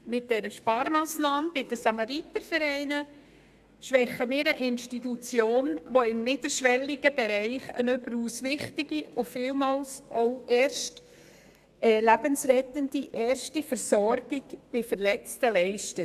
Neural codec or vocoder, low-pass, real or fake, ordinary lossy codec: codec, 32 kHz, 1.9 kbps, SNAC; 14.4 kHz; fake; AAC, 96 kbps